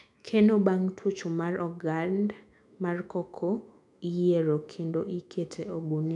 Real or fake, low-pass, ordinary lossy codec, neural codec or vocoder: fake; 10.8 kHz; none; autoencoder, 48 kHz, 128 numbers a frame, DAC-VAE, trained on Japanese speech